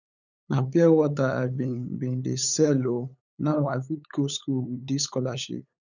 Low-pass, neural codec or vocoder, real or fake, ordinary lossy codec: 7.2 kHz; codec, 16 kHz, 8 kbps, FunCodec, trained on LibriTTS, 25 frames a second; fake; none